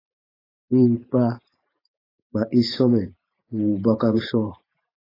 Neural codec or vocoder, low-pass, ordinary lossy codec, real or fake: vocoder, 44.1 kHz, 128 mel bands every 512 samples, BigVGAN v2; 5.4 kHz; AAC, 24 kbps; fake